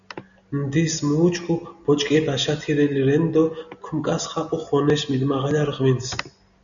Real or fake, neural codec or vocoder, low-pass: real; none; 7.2 kHz